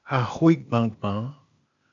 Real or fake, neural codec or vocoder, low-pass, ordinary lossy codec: fake; codec, 16 kHz, 0.8 kbps, ZipCodec; 7.2 kHz; AAC, 64 kbps